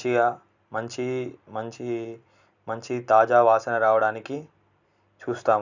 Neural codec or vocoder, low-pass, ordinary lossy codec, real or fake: none; 7.2 kHz; none; real